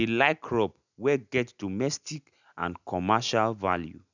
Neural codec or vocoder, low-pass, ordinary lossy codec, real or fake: none; 7.2 kHz; none; real